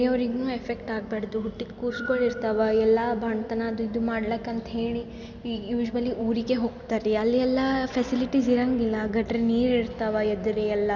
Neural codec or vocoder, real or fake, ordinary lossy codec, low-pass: none; real; none; 7.2 kHz